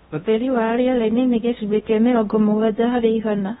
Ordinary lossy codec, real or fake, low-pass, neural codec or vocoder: AAC, 16 kbps; fake; 10.8 kHz; codec, 16 kHz in and 24 kHz out, 0.6 kbps, FocalCodec, streaming, 2048 codes